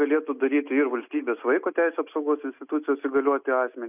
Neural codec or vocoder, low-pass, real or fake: none; 3.6 kHz; real